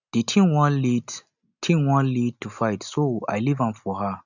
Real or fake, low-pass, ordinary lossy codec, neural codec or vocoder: real; 7.2 kHz; none; none